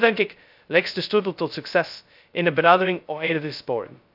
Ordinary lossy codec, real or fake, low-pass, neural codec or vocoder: AAC, 48 kbps; fake; 5.4 kHz; codec, 16 kHz, 0.2 kbps, FocalCodec